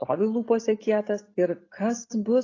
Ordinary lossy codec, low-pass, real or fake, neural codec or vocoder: AAC, 32 kbps; 7.2 kHz; real; none